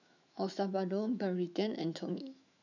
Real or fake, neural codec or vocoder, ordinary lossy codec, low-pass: fake; autoencoder, 48 kHz, 128 numbers a frame, DAC-VAE, trained on Japanese speech; none; 7.2 kHz